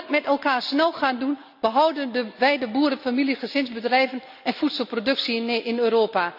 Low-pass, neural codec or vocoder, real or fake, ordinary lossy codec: 5.4 kHz; none; real; none